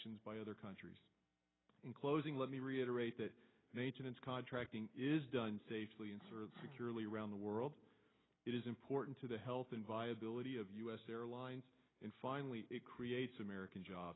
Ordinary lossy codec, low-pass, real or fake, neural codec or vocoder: AAC, 16 kbps; 7.2 kHz; real; none